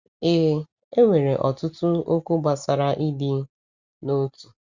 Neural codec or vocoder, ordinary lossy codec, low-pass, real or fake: none; Opus, 64 kbps; 7.2 kHz; real